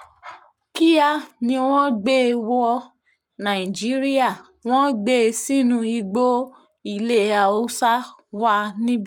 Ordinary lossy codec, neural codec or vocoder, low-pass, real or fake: none; codec, 44.1 kHz, 7.8 kbps, Pupu-Codec; 19.8 kHz; fake